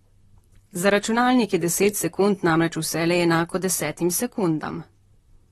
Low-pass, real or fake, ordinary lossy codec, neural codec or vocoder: 19.8 kHz; fake; AAC, 32 kbps; vocoder, 44.1 kHz, 128 mel bands, Pupu-Vocoder